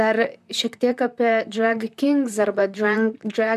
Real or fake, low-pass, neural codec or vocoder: fake; 14.4 kHz; vocoder, 44.1 kHz, 128 mel bands, Pupu-Vocoder